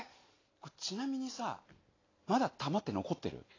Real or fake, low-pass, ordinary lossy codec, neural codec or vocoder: real; 7.2 kHz; AAC, 32 kbps; none